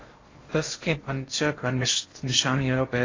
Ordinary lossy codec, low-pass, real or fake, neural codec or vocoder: AAC, 32 kbps; 7.2 kHz; fake; codec, 16 kHz in and 24 kHz out, 0.6 kbps, FocalCodec, streaming, 4096 codes